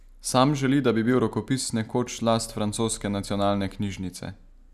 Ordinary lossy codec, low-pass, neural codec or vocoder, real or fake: none; 14.4 kHz; none; real